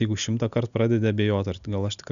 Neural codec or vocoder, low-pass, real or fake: none; 7.2 kHz; real